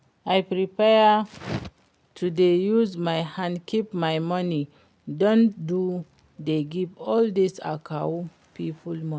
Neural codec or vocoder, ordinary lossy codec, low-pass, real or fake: none; none; none; real